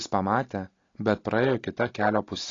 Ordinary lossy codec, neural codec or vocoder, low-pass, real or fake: AAC, 32 kbps; none; 7.2 kHz; real